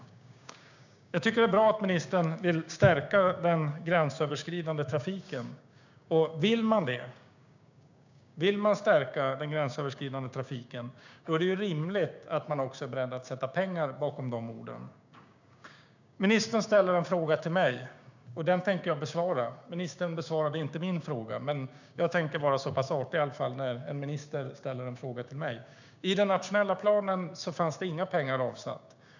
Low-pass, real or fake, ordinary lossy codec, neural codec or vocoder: 7.2 kHz; fake; none; codec, 16 kHz, 6 kbps, DAC